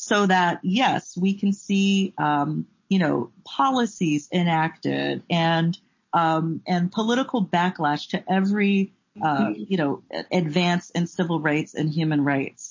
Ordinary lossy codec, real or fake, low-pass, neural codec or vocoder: MP3, 32 kbps; real; 7.2 kHz; none